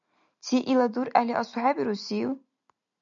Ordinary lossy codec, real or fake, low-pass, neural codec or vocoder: MP3, 96 kbps; real; 7.2 kHz; none